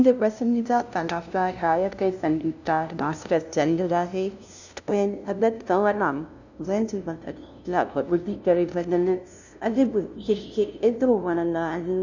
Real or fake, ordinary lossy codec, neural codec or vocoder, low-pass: fake; none; codec, 16 kHz, 0.5 kbps, FunCodec, trained on LibriTTS, 25 frames a second; 7.2 kHz